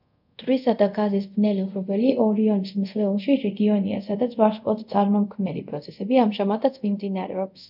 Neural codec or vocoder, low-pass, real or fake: codec, 24 kHz, 0.5 kbps, DualCodec; 5.4 kHz; fake